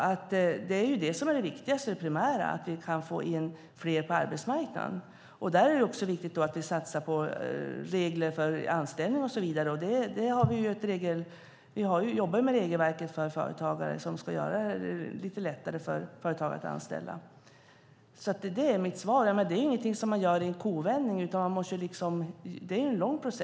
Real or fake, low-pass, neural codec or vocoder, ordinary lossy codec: real; none; none; none